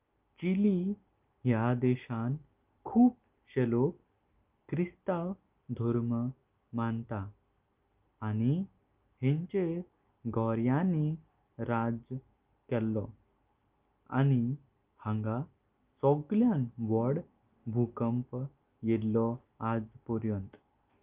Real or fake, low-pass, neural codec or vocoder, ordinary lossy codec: real; 3.6 kHz; none; Opus, 24 kbps